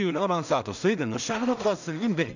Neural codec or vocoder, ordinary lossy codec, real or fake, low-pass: codec, 16 kHz in and 24 kHz out, 0.4 kbps, LongCat-Audio-Codec, two codebook decoder; none; fake; 7.2 kHz